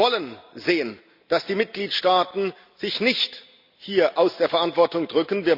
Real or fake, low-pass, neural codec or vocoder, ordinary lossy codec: real; 5.4 kHz; none; Opus, 64 kbps